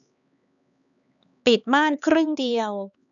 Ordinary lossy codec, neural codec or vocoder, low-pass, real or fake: none; codec, 16 kHz, 4 kbps, X-Codec, HuBERT features, trained on LibriSpeech; 7.2 kHz; fake